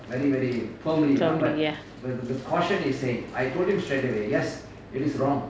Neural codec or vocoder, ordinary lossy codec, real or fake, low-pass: none; none; real; none